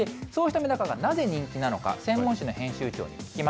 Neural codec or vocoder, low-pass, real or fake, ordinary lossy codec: none; none; real; none